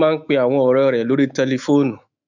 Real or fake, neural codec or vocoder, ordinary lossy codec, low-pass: fake; autoencoder, 48 kHz, 128 numbers a frame, DAC-VAE, trained on Japanese speech; none; 7.2 kHz